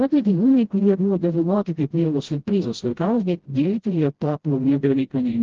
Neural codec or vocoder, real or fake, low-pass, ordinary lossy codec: codec, 16 kHz, 0.5 kbps, FreqCodec, smaller model; fake; 7.2 kHz; Opus, 16 kbps